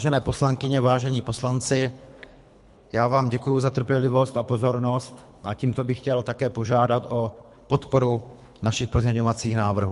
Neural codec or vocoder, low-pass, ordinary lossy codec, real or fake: codec, 24 kHz, 3 kbps, HILCodec; 10.8 kHz; MP3, 64 kbps; fake